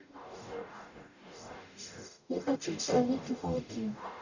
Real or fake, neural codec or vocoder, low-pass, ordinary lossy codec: fake; codec, 44.1 kHz, 0.9 kbps, DAC; 7.2 kHz; none